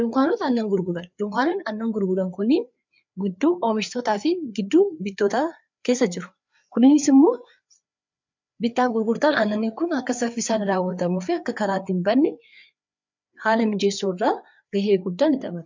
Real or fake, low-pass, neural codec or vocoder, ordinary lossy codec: fake; 7.2 kHz; codec, 16 kHz, 4 kbps, FreqCodec, larger model; MP3, 64 kbps